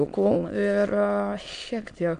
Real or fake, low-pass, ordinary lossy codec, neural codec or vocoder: fake; 9.9 kHz; AAC, 64 kbps; autoencoder, 22.05 kHz, a latent of 192 numbers a frame, VITS, trained on many speakers